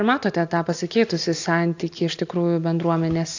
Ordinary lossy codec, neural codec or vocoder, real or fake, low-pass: AAC, 48 kbps; none; real; 7.2 kHz